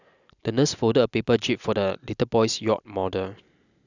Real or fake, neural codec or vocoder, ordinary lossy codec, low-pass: real; none; none; 7.2 kHz